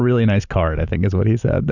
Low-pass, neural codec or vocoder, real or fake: 7.2 kHz; none; real